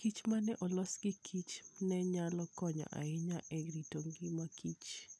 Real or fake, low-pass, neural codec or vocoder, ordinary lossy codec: real; none; none; none